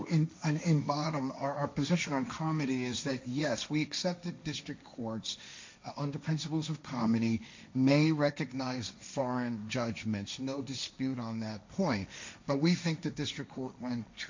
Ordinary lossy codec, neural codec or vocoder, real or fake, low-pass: MP3, 48 kbps; codec, 16 kHz, 1.1 kbps, Voila-Tokenizer; fake; 7.2 kHz